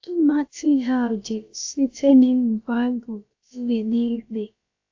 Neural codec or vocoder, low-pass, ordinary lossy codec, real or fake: codec, 16 kHz, about 1 kbps, DyCAST, with the encoder's durations; 7.2 kHz; AAC, 48 kbps; fake